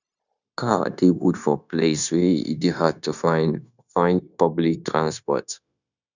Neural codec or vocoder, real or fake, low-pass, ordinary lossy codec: codec, 16 kHz, 0.9 kbps, LongCat-Audio-Codec; fake; 7.2 kHz; none